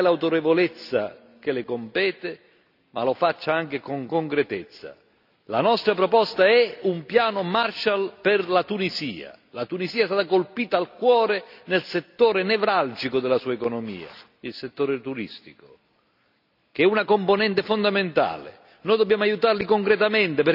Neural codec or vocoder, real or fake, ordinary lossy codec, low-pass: none; real; none; 5.4 kHz